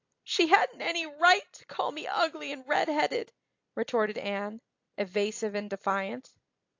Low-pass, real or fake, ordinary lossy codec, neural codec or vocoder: 7.2 kHz; real; AAC, 48 kbps; none